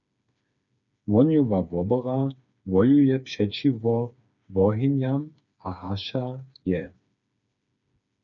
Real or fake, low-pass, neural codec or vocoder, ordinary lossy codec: fake; 7.2 kHz; codec, 16 kHz, 4 kbps, FreqCodec, smaller model; MP3, 96 kbps